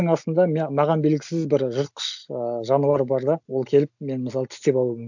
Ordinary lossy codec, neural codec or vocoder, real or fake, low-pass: none; none; real; 7.2 kHz